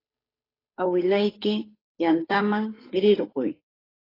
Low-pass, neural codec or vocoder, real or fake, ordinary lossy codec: 5.4 kHz; codec, 16 kHz, 8 kbps, FunCodec, trained on Chinese and English, 25 frames a second; fake; AAC, 24 kbps